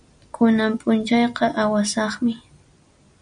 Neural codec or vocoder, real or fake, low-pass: none; real; 9.9 kHz